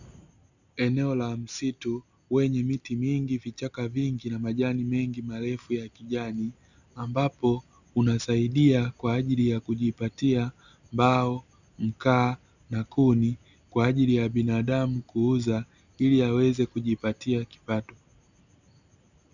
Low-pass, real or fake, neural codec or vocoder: 7.2 kHz; real; none